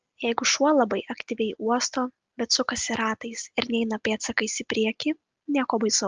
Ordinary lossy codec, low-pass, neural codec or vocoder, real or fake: Opus, 24 kbps; 7.2 kHz; none; real